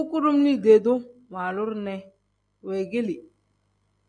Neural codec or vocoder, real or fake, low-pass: none; real; 9.9 kHz